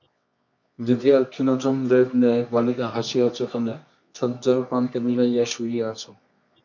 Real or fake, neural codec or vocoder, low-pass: fake; codec, 24 kHz, 0.9 kbps, WavTokenizer, medium music audio release; 7.2 kHz